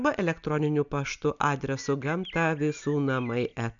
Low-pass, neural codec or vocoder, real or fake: 7.2 kHz; none; real